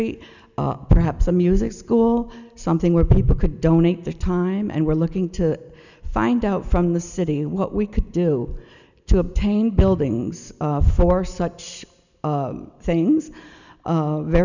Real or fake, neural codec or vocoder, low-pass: real; none; 7.2 kHz